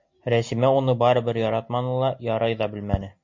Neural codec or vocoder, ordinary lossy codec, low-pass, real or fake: none; MP3, 48 kbps; 7.2 kHz; real